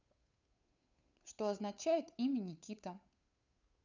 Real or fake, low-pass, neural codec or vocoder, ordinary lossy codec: fake; 7.2 kHz; codec, 16 kHz, 8 kbps, FunCodec, trained on Chinese and English, 25 frames a second; none